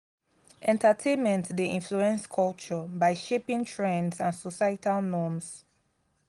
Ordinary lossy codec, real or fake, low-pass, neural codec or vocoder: Opus, 24 kbps; real; 10.8 kHz; none